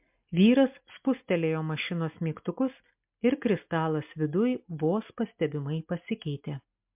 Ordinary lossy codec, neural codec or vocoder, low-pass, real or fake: MP3, 32 kbps; none; 3.6 kHz; real